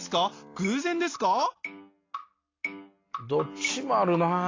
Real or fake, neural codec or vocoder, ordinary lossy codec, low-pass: real; none; AAC, 32 kbps; 7.2 kHz